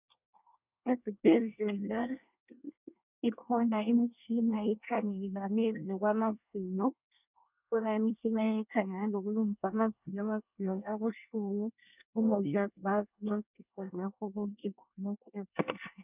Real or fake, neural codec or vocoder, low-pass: fake; codec, 24 kHz, 1 kbps, SNAC; 3.6 kHz